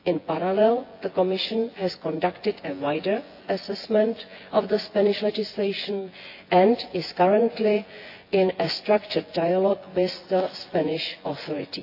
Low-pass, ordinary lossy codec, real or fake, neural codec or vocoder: 5.4 kHz; AAC, 32 kbps; fake; vocoder, 24 kHz, 100 mel bands, Vocos